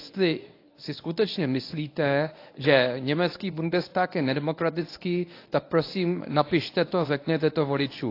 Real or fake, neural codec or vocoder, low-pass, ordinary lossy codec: fake; codec, 24 kHz, 0.9 kbps, WavTokenizer, medium speech release version 1; 5.4 kHz; AAC, 32 kbps